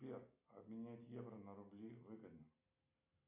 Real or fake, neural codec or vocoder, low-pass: real; none; 3.6 kHz